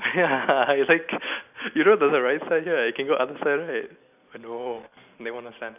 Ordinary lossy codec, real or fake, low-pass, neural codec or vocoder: none; real; 3.6 kHz; none